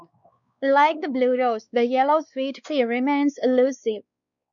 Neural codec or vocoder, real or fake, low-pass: codec, 16 kHz, 2 kbps, X-Codec, WavLM features, trained on Multilingual LibriSpeech; fake; 7.2 kHz